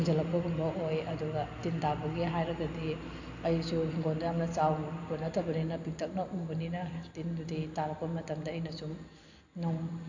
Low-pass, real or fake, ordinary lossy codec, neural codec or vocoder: 7.2 kHz; real; AAC, 48 kbps; none